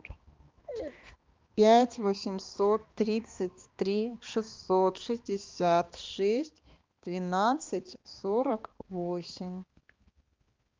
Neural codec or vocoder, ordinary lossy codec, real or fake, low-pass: codec, 16 kHz, 2 kbps, X-Codec, HuBERT features, trained on balanced general audio; Opus, 32 kbps; fake; 7.2 kHz